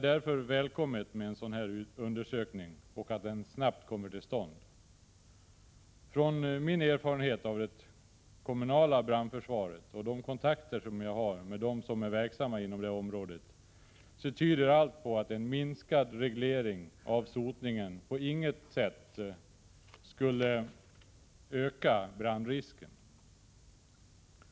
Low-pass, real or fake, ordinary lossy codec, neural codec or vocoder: none; real; none; none